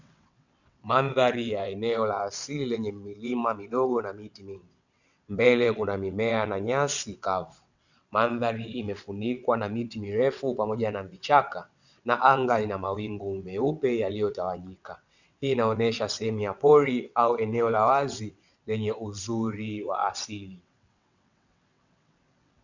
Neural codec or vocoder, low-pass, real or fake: vocoder, 22.05 kHz, 80 mel bands, WaveNeXt; 7.2 kHz; fake